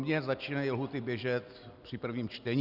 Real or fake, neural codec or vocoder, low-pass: real; none; 5.4 kHz